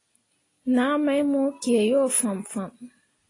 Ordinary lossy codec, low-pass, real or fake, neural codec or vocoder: AAC, 32 kbps; 10.8 kHz; real; none